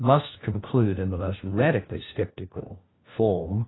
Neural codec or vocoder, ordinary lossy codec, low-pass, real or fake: codec, 16 kHz, 1 kbps, FunCodec, trained on LibriTTS, 50 frames a second; AAC, 16 kbps; 7.2 kHz; fake